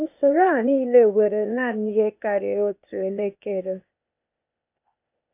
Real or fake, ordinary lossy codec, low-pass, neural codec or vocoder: fake; none; 3.6 kHz; codec, 16 kHz, 0.8 kbps, ZipCodec